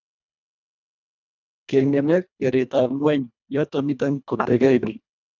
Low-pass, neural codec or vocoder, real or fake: 7.2 kHz; codec, 24 kHz, 1.5 kbps, HILCodec; fake